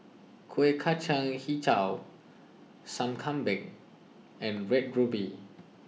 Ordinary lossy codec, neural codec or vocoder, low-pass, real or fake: none; none; none; real